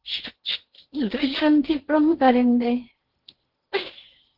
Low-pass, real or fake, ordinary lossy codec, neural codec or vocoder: 5.4 kHz; fake; Opus, 16 kbps; codec, 16 kHz in and 24 kHz out, 0.8 kbps, FocalCodec, streaming, 65536 codes